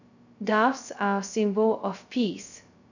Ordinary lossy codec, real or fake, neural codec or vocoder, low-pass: none; fake; codec, 16 kHz, 0.2 kbps, FocalCodec; 7.2 kHz